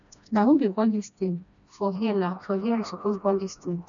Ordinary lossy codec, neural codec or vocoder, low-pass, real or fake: none; codec, 16 kHz, 1 kbps, FreqCodec, smaller model; 7.2 kHz; fake